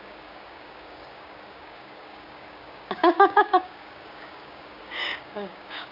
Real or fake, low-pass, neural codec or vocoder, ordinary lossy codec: fake; 5.4 kHz; codec, 16 kHz, 6 kbps, DAC; none